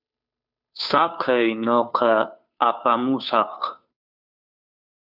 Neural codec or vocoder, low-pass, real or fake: codec, 16 kHz, 2 kbps, FunCodec, trained on Chinese and English, 25 frames a second; 5.4 kHz; fake